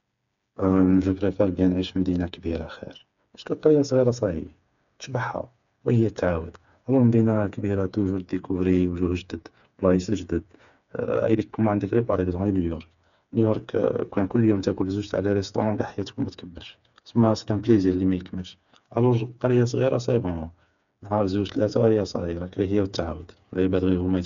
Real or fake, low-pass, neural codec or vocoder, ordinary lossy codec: fake; 7.2 kHz; codec, 16 kHz, 4 kbps, FreqCodec, smaller model; none